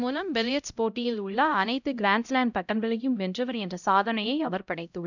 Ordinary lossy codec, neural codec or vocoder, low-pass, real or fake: none; codec, 16 kHz, 0.5 kbps, X-Codec, HuBERT features, trained on LibriSpeech; 7.2 kHz; fake